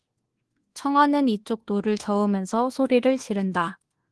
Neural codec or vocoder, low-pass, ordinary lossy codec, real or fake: codec, 24 kHz, 1.2 kbps, DualCodec; 10.8 kHz; Opus, 16 kbps; fake